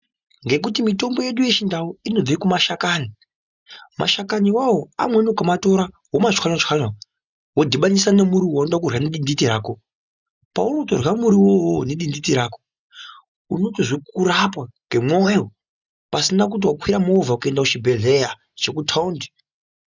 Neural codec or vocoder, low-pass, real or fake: none; 7.2 kHz; real